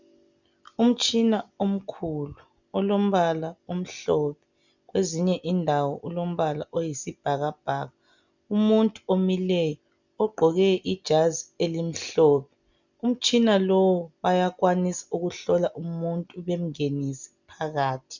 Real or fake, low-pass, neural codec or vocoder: real; 7.2 kHz; none